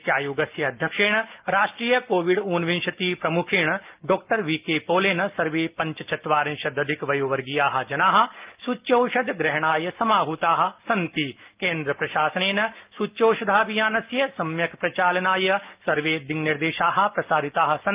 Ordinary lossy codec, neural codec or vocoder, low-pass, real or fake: Opus, 24 kbps; none; 3.6 kHz; real